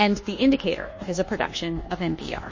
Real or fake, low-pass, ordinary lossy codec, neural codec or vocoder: fake; 7.2 kHz; MP3, 32 kbps; codec, 24 kHz, 1.2 kbps, DualCodec